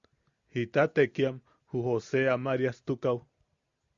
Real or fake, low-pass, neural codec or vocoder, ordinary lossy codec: real; 7.2 kHz; none; Opus, 64 kbps